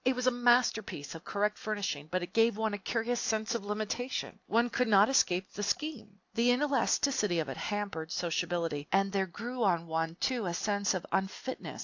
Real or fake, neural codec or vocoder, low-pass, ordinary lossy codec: real; none; 7.2 kHz; AAC, 48 kbps